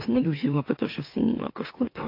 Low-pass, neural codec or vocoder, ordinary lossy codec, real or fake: 5.4 kHz; autoencoder, 44.1 kHz, a latent of 192 numbers a frame, MeloTTS; AAC, 32 kbps; fake